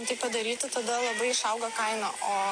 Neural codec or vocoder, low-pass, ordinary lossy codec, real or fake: none; 9.9 kHz; AAC, 48 kbps; real